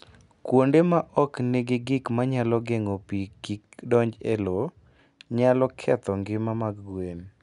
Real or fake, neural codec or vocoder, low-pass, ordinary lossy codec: real; none; 10.8 kHz; none